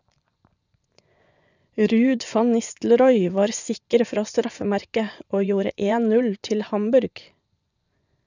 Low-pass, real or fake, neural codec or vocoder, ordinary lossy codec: 7.2 kHz; fake; vocoder, 24 kHz, 100 mel bands, Vocos; none